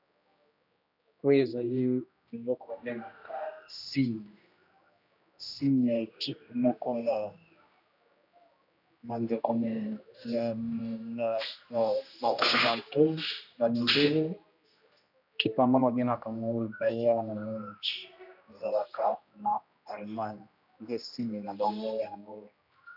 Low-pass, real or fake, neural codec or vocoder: 5.4 kHz; fake; codec, 16 kHz, 1 kbps, X-Codec, HuBERT features, trained on general audio